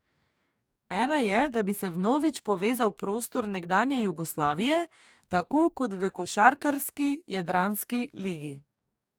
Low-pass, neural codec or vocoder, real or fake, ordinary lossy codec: none; codec, 44.1 kHz, 2.6 kbps, DAC; fake; none